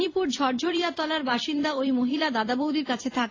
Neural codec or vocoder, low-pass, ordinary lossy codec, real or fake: vocoder, 44.1 kHz, 128 mel bands every 256 samples, BigVGAN v2; 7.2 kHz; AAC, 32 kbps; fake